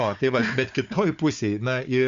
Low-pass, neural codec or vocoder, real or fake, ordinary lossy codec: 7.2 kHz; codec, 16 kHz, 4 kbps, FunCodec, trained on Chinese and English, 50 frames a second; fake; Opus, 64 kbps